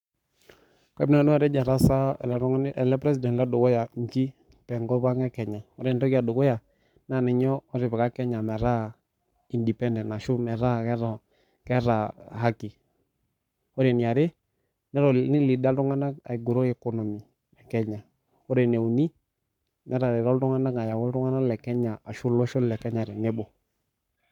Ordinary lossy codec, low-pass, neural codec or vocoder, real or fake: none; 19.8 kHz; codec, 44.1 kHz, 7.8 kbps, Pupu-Codec; fake